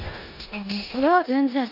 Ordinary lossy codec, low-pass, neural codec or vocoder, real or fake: none; 5.4 kHz; codec, 16 kHz in and 24 kHz out, 0.9 kbps, LongCat-Audio-Codec, four codebook decoder; fake